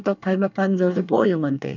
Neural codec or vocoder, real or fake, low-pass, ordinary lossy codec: codec, 24 kHz, 1 kbps, SNAC; fake; 7.2 kHz; none